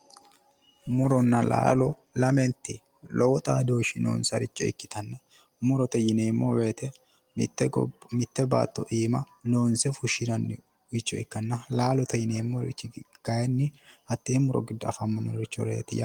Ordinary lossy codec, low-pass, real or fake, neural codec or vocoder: Opus, 24 kbps; 19.8 kHz; real; none